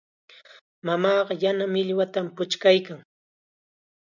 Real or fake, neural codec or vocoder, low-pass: real; none; 7.2 kHz